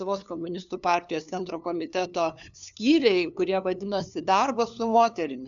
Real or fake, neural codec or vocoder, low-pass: fake; codec, 16 kHz, 2 kbps, FunCodec, trained on LibriTTS, 25 frames a second; 7.2 kHz